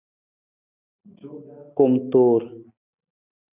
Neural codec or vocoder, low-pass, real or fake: none; 3.6 kHz; real